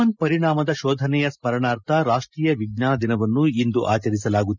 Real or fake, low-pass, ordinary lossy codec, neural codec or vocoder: real; 7.2 kHz; none; none